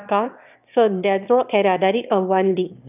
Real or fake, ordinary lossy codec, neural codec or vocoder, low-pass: fake; none; autoencoder, 22.05 kHz, a latent of 192 numbers a frame, VITS, trained on one speaker; 3.6 kHz